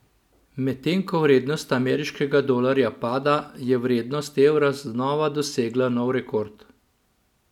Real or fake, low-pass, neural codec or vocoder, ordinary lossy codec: real; 19.8 kHz; none; none